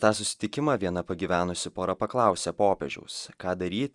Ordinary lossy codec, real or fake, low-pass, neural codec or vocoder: Opus, 64 kbps; real; 10.8 kHz; none